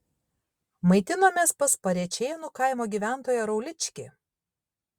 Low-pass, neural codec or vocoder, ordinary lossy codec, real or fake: 19.8 kHz; none; Opus, 64 kbps; real